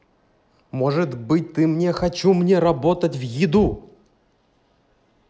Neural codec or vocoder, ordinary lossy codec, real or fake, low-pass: none; none; real; none